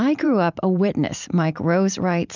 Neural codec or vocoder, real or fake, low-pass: vocoder, 44.1 kHz, 128 mel bands every 512 samples, BigVGAN v2; fake; 7.2 kHz